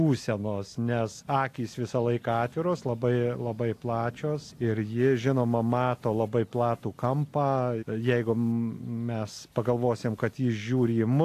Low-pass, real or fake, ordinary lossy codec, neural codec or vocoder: 14.4 kHz; real; AAC, 64 kbps; none